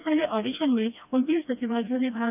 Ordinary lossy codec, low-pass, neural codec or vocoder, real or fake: none; 3.6 kHz; codec, 16 kHz, 1 kbps, FreqCodec, smaller model; fake